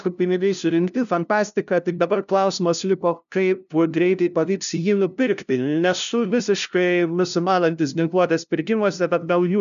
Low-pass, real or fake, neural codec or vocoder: 7.2 kHz; fake; codec, 16 kHz, 0.5 kbps, FunCodec, trained on LibriTTS, 25 frames a second